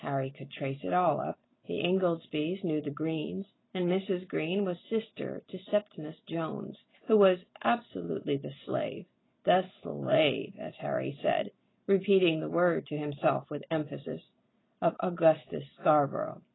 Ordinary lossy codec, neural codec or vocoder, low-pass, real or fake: AAC, 16 kbps; none; 7.2 kHz; real